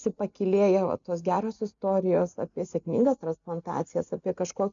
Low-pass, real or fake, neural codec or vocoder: 7.2 kHz; real; none